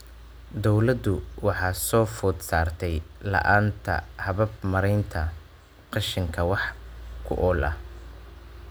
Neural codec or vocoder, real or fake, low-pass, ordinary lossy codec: none; real; none; none